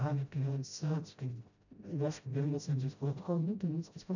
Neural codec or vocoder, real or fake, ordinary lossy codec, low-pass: codec, 16 kHz, 0.5 kbps, FreqCodec, smaller model; fake; none; 7.2 kHz